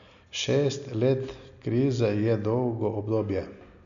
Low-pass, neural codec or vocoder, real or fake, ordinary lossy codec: 7.2 kHz; none; real; MP3, 96 kbps